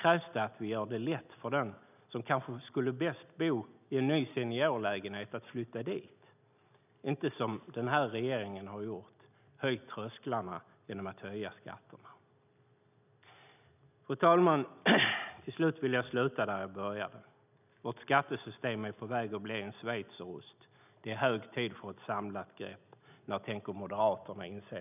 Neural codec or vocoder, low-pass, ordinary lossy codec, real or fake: none; 3.6 kHz; none; real